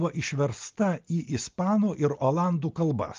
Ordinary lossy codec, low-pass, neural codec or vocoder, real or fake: Opus, 32 kbps; 7.2 kHz; none; real